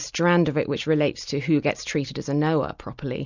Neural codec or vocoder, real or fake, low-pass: none; real; 7.2 kHz